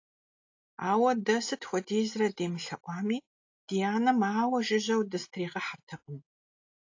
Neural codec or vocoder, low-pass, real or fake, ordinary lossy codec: none; 7.2 kHz; real; AAC, 48 kbps